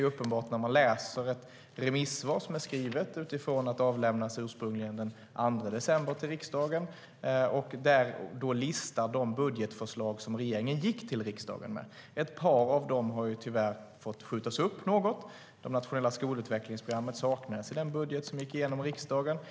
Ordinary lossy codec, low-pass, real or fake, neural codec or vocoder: none; none; real; none